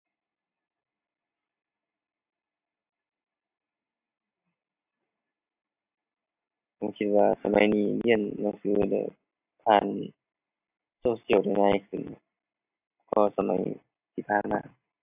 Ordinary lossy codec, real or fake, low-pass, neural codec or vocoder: AAC, 32 kbps; real; 3.6 kHz; none